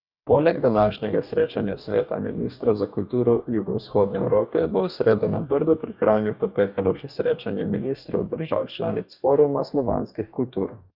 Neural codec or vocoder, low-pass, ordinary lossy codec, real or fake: codec, 44.1 kHz, 2.6 kbps, DAC; 5.4 kHz; none; fake